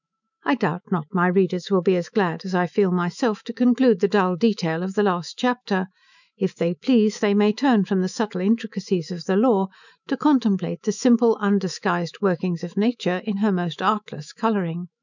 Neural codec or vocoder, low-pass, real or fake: autoencoder, 48 kHz, 128 numbers a frame, DAC-VAE, trained on Japanese speech; 7.2 kHz; fake